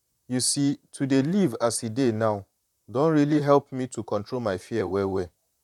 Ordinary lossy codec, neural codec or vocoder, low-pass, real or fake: none; vocoder, 44.1 kHz, 128 mel bands, Pupu-Vocoder; 19.8 kHz; fake